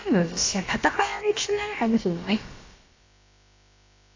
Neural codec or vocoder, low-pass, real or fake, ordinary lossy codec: codec, 16 kHz, about 1 kbps, DyCAST, with the encoder's durations; 7.2 kHz; fake; AAC, 32 kbps